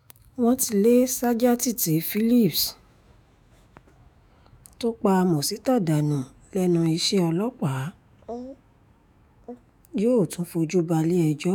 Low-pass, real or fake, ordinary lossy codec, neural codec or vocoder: none; fake; none; autoencoder, 48 kHz, 128 numbers a frame, DAC-VAE, trained on Japanese speech